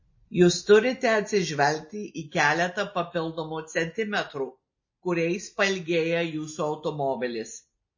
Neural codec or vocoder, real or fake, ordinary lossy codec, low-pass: none; real; MP3, 32 kbps; 7.2 kHz